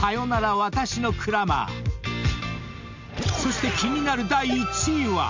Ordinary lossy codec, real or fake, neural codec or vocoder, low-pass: MP3, 64 kbps; real; none; 7.2 kHz